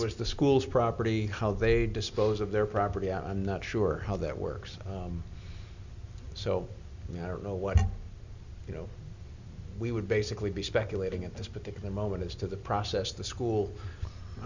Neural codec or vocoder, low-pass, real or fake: none; 7.2 kHz; real